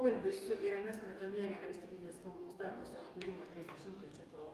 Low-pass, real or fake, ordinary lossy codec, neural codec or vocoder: 14.4 kHz; fake; Opus, 32 kbps; codec, 44.1 kHz, 2.6 kbps, DAC